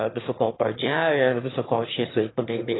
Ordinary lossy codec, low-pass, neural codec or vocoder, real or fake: AAC, 16 kbps; 7.2 kHz; autoencoder, 22.05 kHz, a latent of 192 numbers a frame, VITS, trained on one speaker; fake